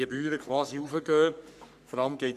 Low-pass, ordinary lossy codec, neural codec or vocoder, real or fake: 14.4 kHz; none; codec, 44.1 kHz, 3.4 kbps, Pupu-Codec; fake